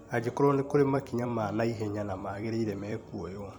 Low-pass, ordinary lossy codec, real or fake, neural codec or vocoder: 19.8 kHz; none; fake; vocoder, 44.1 kHz, 128 mel bands every 256 samples, BigVGAN v2